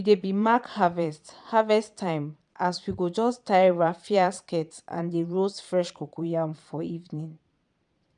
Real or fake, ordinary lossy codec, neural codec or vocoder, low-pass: fake; none; vocoder, 22.05 kHz, 80 mel bands, Vocos; 9.9 kHz